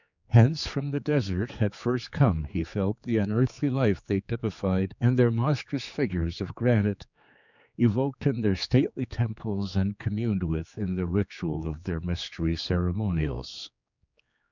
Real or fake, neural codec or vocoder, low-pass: fake; codec, 16 kHz, 4 kbps, X-Codec, HuBERT features, trained on general audio; 7.2 kHz